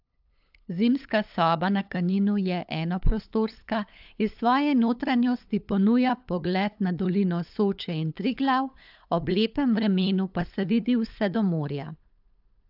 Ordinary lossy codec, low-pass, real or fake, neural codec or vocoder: AAC, 48 kbps; 5.4 kHz; fake; codec, 16 kHz, 8 kbps, FunCodec, trained on LibriTTS, 25 frames a second